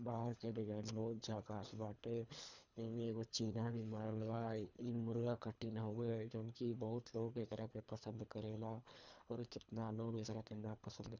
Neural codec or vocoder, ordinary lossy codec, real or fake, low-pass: codec, 24 kHz, 3 kbps, HILCodec; none; fake; 7.2 kHz